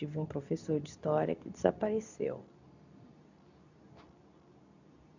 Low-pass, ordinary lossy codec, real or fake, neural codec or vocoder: 7.2 kHz; none; fake; codec, 24 kHz, 0.9 kbps, WavTokenizer, medium speech release version 2